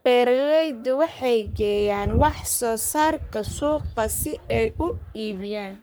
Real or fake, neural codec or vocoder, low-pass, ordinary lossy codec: fake; codec, 44.1 kHz, 3.4 kbps, Pupu-Codec; none; none